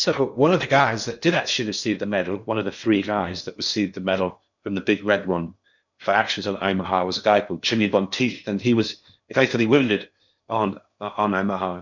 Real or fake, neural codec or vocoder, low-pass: fake; codec, 16 kHz in and 24 kHz out, 0.8 kbps, FocalCodec, streaming, 65536 codes; 7.2 kHz